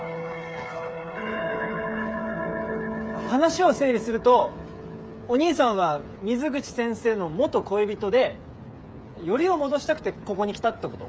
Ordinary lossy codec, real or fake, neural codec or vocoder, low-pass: none; fake; codec, 16 kHz, 8 kbps, FreqCodec, smaller model; none